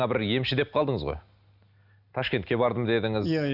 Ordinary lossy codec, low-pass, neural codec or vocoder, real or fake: none; 5.4 kHz; none; real